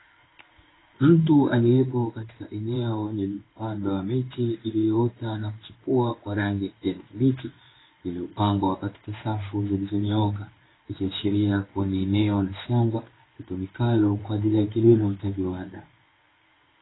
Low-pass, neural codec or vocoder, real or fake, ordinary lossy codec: 7.2 kHz; codec, 16 kHz in and 24 kHz out, 1 kbps, XY-Tokenizer; fake; AAC, 16 kbps